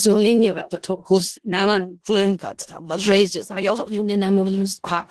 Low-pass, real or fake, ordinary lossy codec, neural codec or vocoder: 10.8 kHz; fake; Opus, 16 kbps; codec, 16 kHz in and 24 kHz out, 0.4 kbps, LongCat-Audio-Codec, four codebook decoder